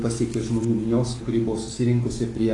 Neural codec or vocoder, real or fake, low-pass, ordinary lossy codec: codec, 44.1 kHz, 7.8 kbps, DAC; fake; 10.8 kHz; AAC, 32 kbps